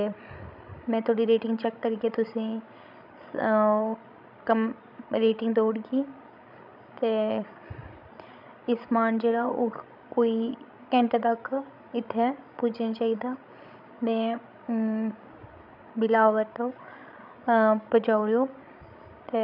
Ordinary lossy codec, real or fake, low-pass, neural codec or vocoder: none; fake; 5.4 kHz; codec, 16 kHz, 16 kbps, FreqCodec, larger model